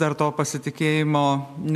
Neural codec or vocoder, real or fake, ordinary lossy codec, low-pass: none; real; MP3, 96 kbps; 14.4 kHz